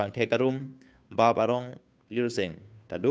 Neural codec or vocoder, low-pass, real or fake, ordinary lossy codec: codec, 16 kHz, 2 kbps, FunCodec, trained on Chinese and English, 25 frames a second; none; fake; none